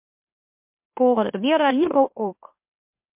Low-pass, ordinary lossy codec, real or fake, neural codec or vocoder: 3.6 kHz; MP3, 32 kbps; fake; autoencoder, 44.1 kHz, a latent of 192 numbers a frame, MeloTTS